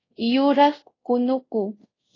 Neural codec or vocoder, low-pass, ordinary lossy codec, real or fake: codec, 24 kHz, 0.5 kbps, DualCodec; 7.2 kHz; AAC, 32 kbps; fake